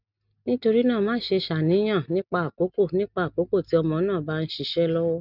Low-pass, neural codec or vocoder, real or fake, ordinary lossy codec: 5.4 kHz; none; real; none